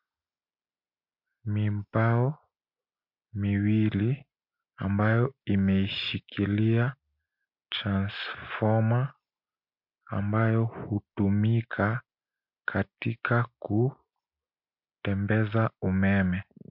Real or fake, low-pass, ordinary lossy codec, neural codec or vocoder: real; 5.4 kHz; MP3, 48 kbps; none